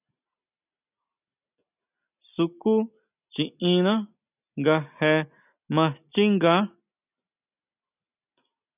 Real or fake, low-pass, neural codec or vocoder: real; 3.6 kHz; none